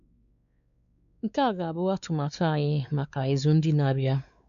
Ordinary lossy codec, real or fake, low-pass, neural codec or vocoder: none; fake; 7.2 kHz; codec, 16 kHz, 4 kbps, X-Codec, WavLM features, trained on Multilingual LibriSpeech